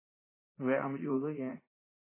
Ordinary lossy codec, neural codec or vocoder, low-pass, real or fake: MP3, 16 kbps; vocoder, 44.1 kHz, 80 mel bands, Vocos; 3.6 kHz; fake